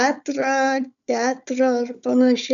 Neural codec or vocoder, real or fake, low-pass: codec, 16 kHz, 4 kbps, FunCodec, trained on Chinese and English, 50 frames a second; fake; 7.2 kHz